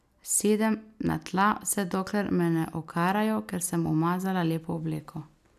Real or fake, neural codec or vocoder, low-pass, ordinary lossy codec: real; none; 14.4 kHz; none